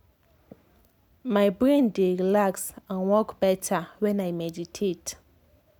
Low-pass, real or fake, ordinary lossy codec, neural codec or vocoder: none; real; none; none